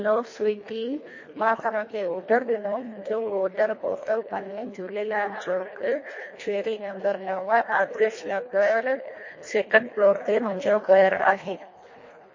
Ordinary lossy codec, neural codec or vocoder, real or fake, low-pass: MP3, 32 kbps; codec, 24 kHz, 1.5 kbps, HILCodec; fake; 7.2 kHz